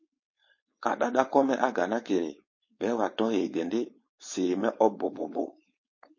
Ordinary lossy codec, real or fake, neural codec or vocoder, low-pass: MP3, 32 kbps; fake; codec, 16 kHz, 4.8 kbps, FACodec; 7.2 kHz